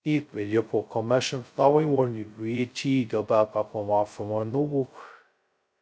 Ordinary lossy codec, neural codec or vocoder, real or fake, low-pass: none; codec, 16 kHz, 0.2 kbps, FocalCodec; fake; none